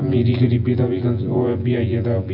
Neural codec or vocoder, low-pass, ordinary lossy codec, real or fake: vocoder, 24 kHz, 100 mel bands, Vocos; 5.4 kHz; AAC, 48 kbps; fake